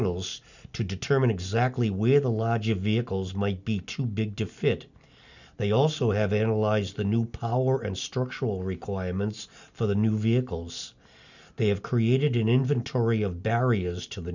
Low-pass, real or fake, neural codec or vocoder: 7.2 kHz; real; none